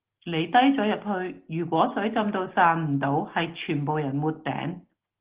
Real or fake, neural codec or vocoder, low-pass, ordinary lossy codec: real; none; 3.6 kHz; Opus, 16 kbps